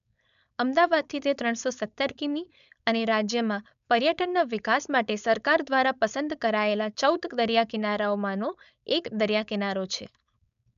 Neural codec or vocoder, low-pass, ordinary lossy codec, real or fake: codec, 16 kHz, 4.8 kbps, FACodec; 7.2 kHz; none; fake